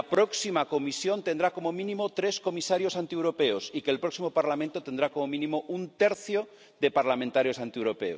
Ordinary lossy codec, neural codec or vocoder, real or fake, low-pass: none; none; real; none